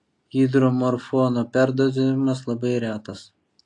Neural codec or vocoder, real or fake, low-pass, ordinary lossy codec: none; real; 10.8 kHz; AAC, 48 kbps